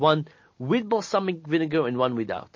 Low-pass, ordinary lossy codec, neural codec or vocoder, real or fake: 7.2 kHz; MP3, 32 kbps; none; real